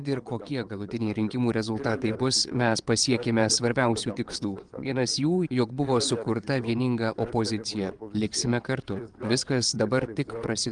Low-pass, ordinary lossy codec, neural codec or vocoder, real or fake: 9.9 kHz; Opus, 24 kbps; vocoder, 22.05 kHz, 80 mel bands, WaveNeXt; fake